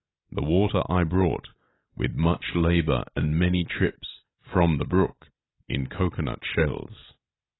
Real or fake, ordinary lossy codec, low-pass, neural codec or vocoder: real; AAC, 16 kbps; 7.2 kHz; none